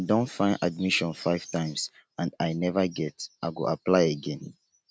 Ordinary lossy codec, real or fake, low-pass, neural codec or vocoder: none; real; none; none